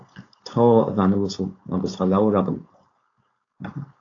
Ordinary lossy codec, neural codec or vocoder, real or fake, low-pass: AAC, 48 kbps; codec, 16 kHz, 4.8 kbps, FACodec; fake; 7.2 kHz